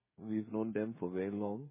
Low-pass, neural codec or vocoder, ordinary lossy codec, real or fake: 3.6 kHz; codec, 16 kHz, 16 kbps, FreqCodec, smaller model; MP3, 16 kbps; fake